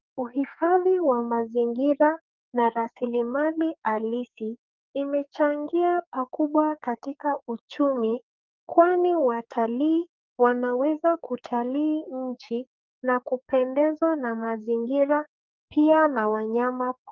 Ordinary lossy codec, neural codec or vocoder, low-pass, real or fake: Opus, 24 kbps; codec, 44.1 kHz, 2.6 kbps, SNAC; 7.2 kHz; fake